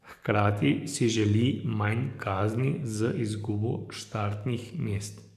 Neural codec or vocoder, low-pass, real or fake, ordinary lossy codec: codec, 44.1 kHz, 7.8 kbps, DAC; 14.4 kHz; fake; none